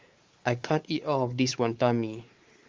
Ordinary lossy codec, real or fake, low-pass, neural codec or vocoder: Opus, 32 kbps; fake; 7.2 kHz; codec, 16 kHz, 4 kbps, X-Codec, WavLM features, trained on Multilingual LibriSpeech